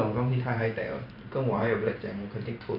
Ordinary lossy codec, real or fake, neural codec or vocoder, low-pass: none; fake; vocoder, 44.1 kHz, 128 mel bands every 512 samples, BigVGAN v2; 5.4 kHz